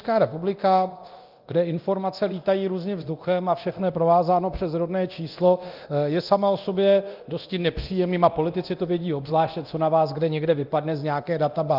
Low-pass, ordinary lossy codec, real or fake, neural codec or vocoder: 5.4 kHz; Opus, 32 kbps; fake; codec, 24 kHz, 0.9 kbps, DualCodec